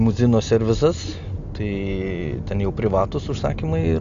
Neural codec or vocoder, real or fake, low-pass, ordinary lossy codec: none; real; 7.2 kHz; MP3, 64 kbps